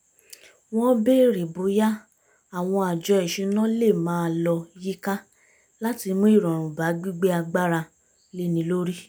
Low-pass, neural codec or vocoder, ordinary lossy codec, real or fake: none; none; none; real